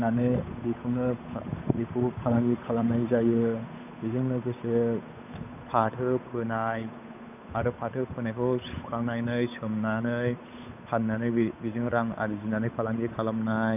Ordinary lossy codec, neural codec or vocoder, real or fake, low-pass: none; codec, 16 kHz, 8 kbps, FunCodec, trained on Chinese and English, 25 frames a second; fake; 3.6 kHz